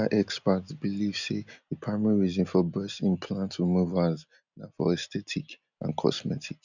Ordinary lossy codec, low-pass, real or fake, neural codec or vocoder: none; 7.2 kHz; real; none